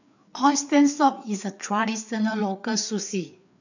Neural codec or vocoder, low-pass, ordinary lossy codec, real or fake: codec, 16 kHz, 4 kbps, FreqCodec, larger model; 7.2 kHz; none; fake